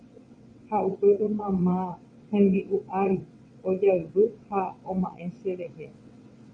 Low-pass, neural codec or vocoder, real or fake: 9.9 kHz; vocoder, 22.05 kHz, 80 mel bands, WaveNeXt; fake